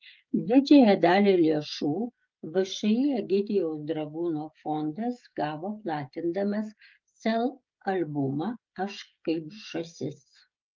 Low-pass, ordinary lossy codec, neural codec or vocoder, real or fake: 7.2 kHz; Opus, 24 kbps; codec, 16 kHz, 16 kbps, FreqCodec, smaller model; fake